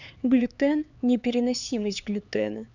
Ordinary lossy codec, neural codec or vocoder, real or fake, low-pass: none; codec, 16 kHz, 4 kbps, X-Codec, HuBERT features, trained on LibriSpeech; fake; 7.2 kHz